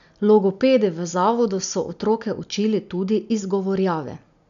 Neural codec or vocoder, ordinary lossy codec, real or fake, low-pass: none; none; real; 7.2 kHz